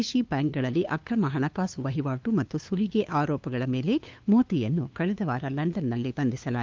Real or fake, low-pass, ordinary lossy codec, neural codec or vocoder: fake; 7.2 kHz; Opus, 32 kbps; codec, 16 kHz, 2 kbps, FunCodec, trained on LibriTTS, 25 frames a second